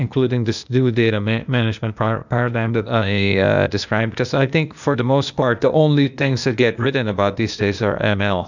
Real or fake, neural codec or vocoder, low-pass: fake; codec, 16 kHz, 0.8 kbps, ZipCodec; 7.2 kHz